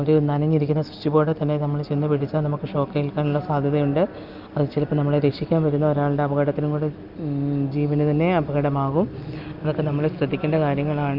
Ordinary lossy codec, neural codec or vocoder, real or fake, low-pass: Opus, 24 kbps; none; real; 5.4 kHz